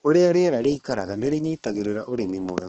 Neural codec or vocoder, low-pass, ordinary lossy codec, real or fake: codec, 16 kHz, 2 kbps, X-Codec, HuBERT features, trained on balanced general audio; 7.2 kHz; Opus, 16 kbps; fake